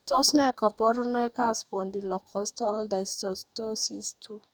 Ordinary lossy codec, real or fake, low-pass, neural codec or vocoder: none; fake; none; codec, 44.1 kHz, 2.6 kbps, DAC